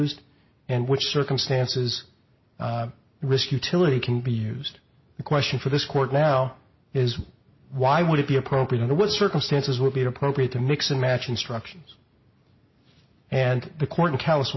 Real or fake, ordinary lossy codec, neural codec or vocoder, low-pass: real; MP3, 24 kbps; none; 7.2 kHz